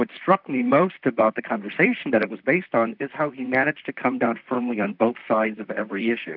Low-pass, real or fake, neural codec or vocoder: 5.4 kHz; fake; vocoder, 44.1 kHz, 128 mel bands, Pupu-Vocoder